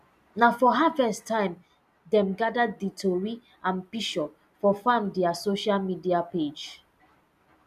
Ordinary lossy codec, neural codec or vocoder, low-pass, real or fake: none; none; 14.4 kHz; real